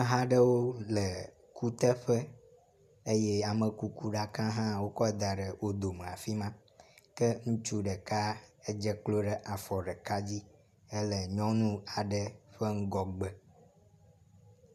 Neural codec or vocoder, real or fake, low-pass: none; real; 14.4 kHz